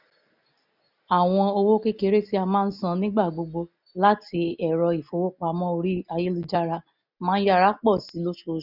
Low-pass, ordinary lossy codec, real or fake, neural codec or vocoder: 5.4 kHz; none; real; none